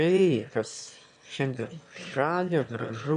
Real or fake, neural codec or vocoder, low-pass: fake; autoencoder, 22.05 kHz, a latent of 192 numbers a frame, VITS, trained on one speaker; 9.9 kHz